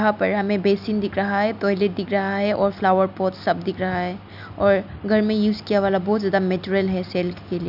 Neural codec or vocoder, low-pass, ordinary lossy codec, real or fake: none; 5.4 kHz; none; real